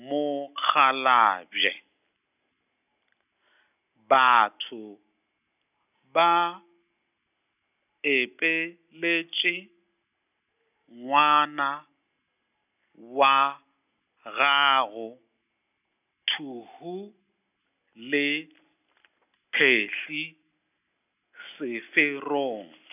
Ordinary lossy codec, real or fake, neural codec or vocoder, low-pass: none; real; none; 3.6 kHz